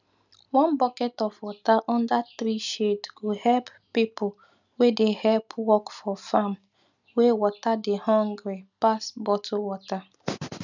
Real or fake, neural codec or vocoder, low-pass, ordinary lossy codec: real; none; 7.2 kHz; none